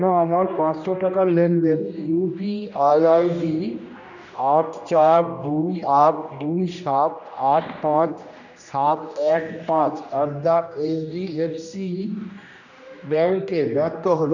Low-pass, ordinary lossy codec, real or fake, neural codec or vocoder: 7.2 kHz; none; fake; codec, 16 kHz, 1 kbps, X-Codec, HuBERT features, trained on general audio